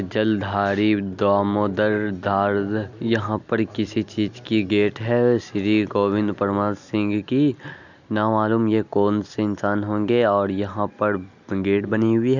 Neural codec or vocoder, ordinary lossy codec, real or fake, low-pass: none; none; real; 7.2 kHz